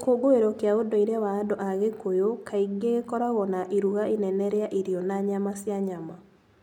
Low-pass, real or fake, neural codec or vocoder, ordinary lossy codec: 19.8 kHz; real; none; none